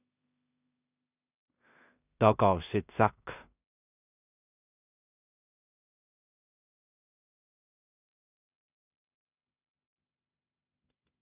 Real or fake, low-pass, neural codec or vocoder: fake; 3.6 kHz; codec, 16 kHz in and 24 kHz out, 0.4 kbps, LongCat-Audio-Codec, two codebook decoder